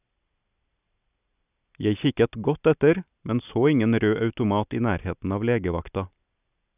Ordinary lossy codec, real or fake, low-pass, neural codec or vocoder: none; real; 3.6 kHz; none